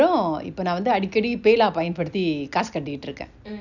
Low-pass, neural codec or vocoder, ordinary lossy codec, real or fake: 7.2 kHz; none; none; real